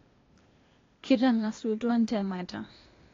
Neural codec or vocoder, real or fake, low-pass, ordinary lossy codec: codec, 16 kHz, 0.8 kbps, ZipCodec; fake; 7.2 kHz; AAC, 32 kbps